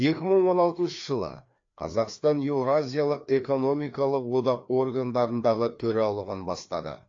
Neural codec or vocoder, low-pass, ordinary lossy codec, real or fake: codec, 16 kHz, 2 kbps, FreqCodec, larger model; 7.2 kHz; AAC, 48 kbps; fake